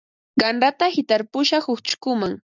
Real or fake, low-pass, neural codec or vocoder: real; 7.2 kHz; none